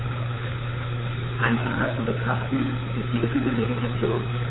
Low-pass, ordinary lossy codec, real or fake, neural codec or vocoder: 7.2 kHz; AAC, 16 kbps; fake; codec, 16 kHz, 4 kbps, FunCodec, trained on LibriTTS, 50 frames a second